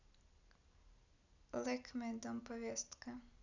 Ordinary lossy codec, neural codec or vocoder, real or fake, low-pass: none; none; real; 7.2 kHz